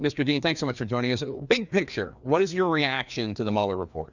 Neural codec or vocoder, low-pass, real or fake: codec, 16 kHz, 2 kbps, FreqCodec, larger model; 7.2 kHz; fake